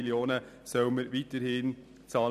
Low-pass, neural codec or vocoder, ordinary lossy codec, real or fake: 14.4 kHz; none; none; real